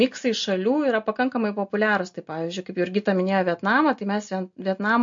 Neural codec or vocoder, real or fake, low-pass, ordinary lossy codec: none; real; 7.2 kHz; MP3, 48 kbps